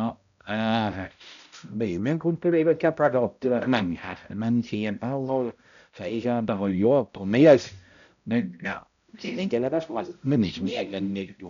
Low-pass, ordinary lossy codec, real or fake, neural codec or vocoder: 7.2 kHz; none; fake; codec, 16 kHz, 0.5 kbps, X-Codec, HuBERT features, trained on balanced general audio